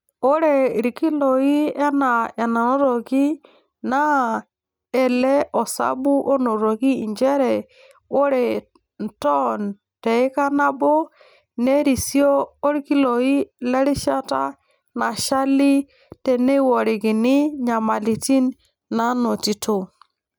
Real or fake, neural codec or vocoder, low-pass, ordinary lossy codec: real; none; none; none